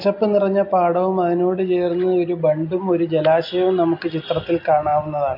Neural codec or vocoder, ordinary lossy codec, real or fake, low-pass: none; MP3, 32 kbps; real; 5.4 kHz